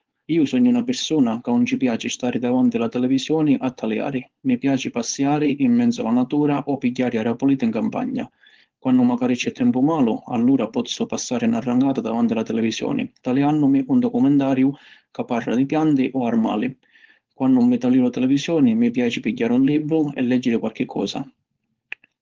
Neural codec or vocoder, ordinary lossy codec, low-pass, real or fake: codec, 16 kHz, 4.8 kbps, FACodec; Opus, 16 kbps; 7.2 kHz; fake